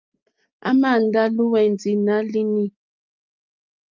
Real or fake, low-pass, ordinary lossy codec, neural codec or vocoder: real; 7.2 kHz; Opus, 24 kbps; none